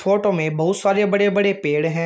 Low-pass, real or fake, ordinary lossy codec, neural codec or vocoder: none; real; none; none